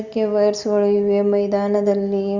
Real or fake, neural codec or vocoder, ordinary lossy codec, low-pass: real; none; Opus, 64 kbps; 7.2 kHz